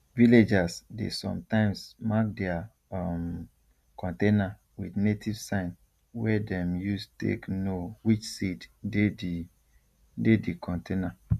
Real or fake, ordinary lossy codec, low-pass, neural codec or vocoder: real; none; 14.4 kHz; none